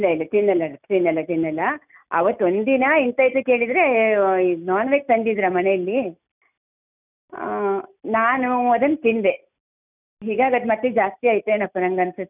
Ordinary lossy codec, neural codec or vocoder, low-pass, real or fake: none; none; 3.6 kHz; real